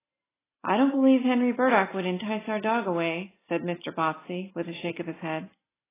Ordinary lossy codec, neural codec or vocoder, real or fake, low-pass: AAC, 16 kbps; none; real; 3.6 kHz